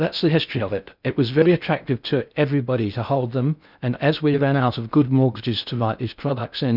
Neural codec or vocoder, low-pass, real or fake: codec, 16 kHz in and 24 kHz out, 0.6 kbps, FocalCodec, streaming, 4096 codes; 5.4 kHz; fake